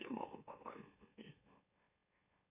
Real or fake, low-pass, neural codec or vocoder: fake; 3.6 kHz; autoencoder, 44.1 kHz, a latent of 192 numbers a frame, MeloTTS